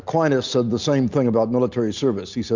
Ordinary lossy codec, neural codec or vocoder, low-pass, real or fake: Opus, 64 kbps; none; 7.2 kHz; real